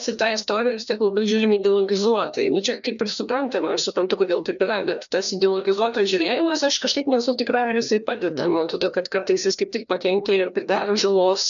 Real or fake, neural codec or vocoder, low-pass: fake; codec, 16 kHz, 1 kbps, FreqCodec, larger model; 7.2 kHz